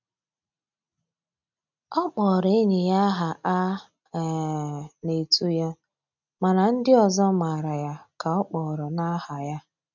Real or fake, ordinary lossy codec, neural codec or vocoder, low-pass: real; none; none; 7.2 kHz